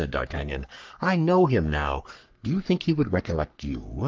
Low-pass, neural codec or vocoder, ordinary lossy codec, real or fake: 7.2 kHz; codec, 44.1 kHz, 3.4 kbps, Pupu-Codec; Opus, 32 kbps; fake